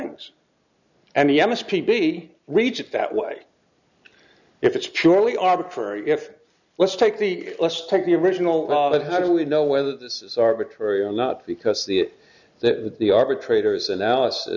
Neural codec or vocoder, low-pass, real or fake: none; 7.2 kHz; real